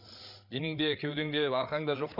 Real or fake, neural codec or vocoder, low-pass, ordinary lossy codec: fake; codec, 16 kHz, 8 kbps, FreqCodec, larger model; 5.4 kHz; none